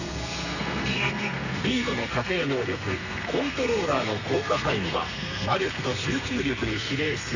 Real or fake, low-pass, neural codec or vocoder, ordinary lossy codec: fake; 7.2 kHz; codec, 32 kHz, 1.9 kbps, SNAC; none